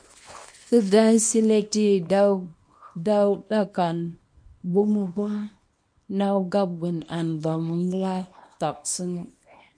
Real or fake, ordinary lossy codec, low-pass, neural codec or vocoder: fake; MP3, 48 kbps; 9.9 kHz; codec, 24 kHz, 0.9 kbps, WavTokenizer, small release